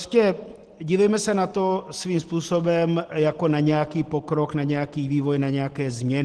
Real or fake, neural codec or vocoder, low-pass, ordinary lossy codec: real; none; 10.8 kHz; Opus, 16 kbps